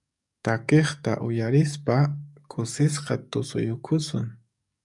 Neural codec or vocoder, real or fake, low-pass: codec, 44.1 kHz, 7.8 kbps, DAC; fake; 10.8 kHz